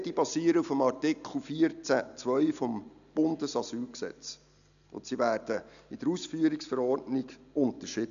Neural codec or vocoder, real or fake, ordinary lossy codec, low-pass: none; real; none; 7.2 kHz